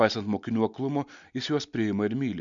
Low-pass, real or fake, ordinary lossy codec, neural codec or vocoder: 7.2 kHz; real; MP3, 64 kbps; none